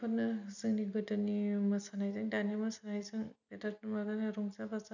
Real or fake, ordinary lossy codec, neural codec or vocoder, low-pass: real; none; none; 7.2 kHz